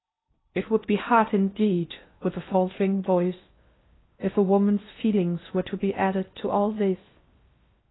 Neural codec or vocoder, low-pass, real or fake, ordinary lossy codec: codec, 16 kHz in and 24 kHz out, 0.6 kbps, FocalCodec, streaming, 2048 codes; 7.2 kHz; fake; AAC, 16 kbps